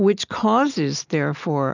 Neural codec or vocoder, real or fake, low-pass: none; real; 7.2 kHz